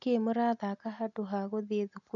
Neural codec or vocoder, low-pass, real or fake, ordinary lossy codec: none; 7.2 kHz; real; none